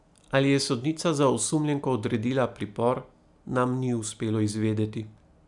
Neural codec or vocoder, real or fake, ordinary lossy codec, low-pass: none; real; none; 10.8 kHz